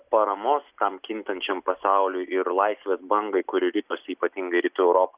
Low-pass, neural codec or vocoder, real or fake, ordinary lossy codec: 3.6 kHz; none; real; Opus, 64 kbps